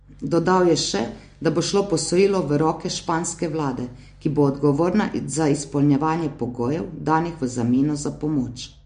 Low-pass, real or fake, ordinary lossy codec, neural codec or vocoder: 9.9 kHz; real; MP3, 48 kbps; none